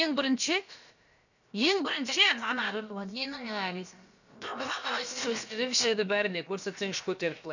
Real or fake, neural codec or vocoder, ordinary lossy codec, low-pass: fake; codec, 16 kHz, about 1 kbps, DyCAST, with the encoder's durations; none; 7.2 kHz